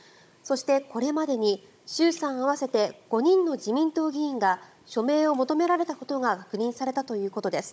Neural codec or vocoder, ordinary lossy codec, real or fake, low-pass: codec, 16 kHz, 16 kbps, FunCodec, trained on Chinese and English, 50 frames a second; none; fake; none